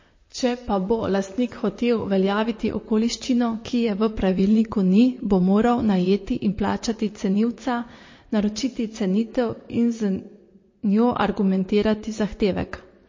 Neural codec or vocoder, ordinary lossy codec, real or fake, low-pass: vocoder, 44.1 kHz, 128 mel bands, Pupu-Vocoder; MP3, 32 kbps; fake; 7.2 kHz